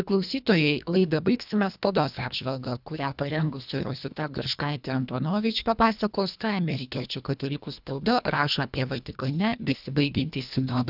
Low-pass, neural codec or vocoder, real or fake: 5.4 kHz; codec, 24 kHz, 1.5 kbps, HILCodec; fake